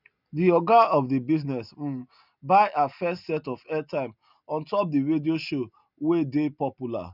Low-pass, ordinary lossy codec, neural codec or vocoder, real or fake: 5.4 kHz; none; none; real